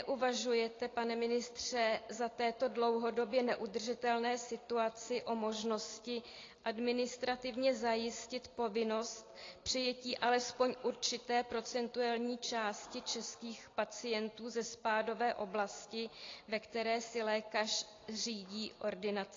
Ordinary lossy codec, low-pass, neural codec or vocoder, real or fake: AAC, 32 kbps; 7.2 kHz; none; real